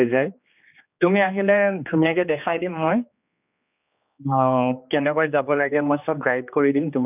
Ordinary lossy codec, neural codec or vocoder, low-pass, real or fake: none; codec, 16 kHz, 2 kbps, X-Codec, HuBERT features, trained on general audio; 3.6 kHz; fake